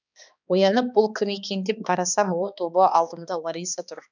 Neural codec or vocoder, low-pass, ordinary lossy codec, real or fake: codec, 16 kHz, 2 kbps, X-Codec, HuBERT features, trained on balanced general audio; 7.2 kHz; none; fake